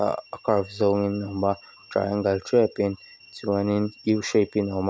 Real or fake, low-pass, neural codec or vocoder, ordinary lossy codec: real; none; none; none